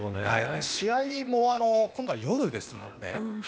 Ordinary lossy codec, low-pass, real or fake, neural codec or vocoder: none; none; fake; codec, 16 kHz, 0.8 kbps, ZipCodec